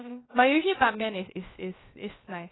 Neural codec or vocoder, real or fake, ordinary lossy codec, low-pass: codec, 16 kHz, about 1 kbps, DyCAST, with the encoder's durations; fake; AAC, 16 kbps; 7.2 kHz